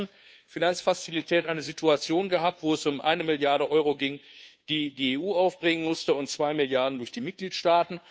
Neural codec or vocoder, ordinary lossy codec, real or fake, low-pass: codec, 16 kHz, 2 kbps, FunCodec, trained on Chinese and English, 25 frames a second; none; fake; none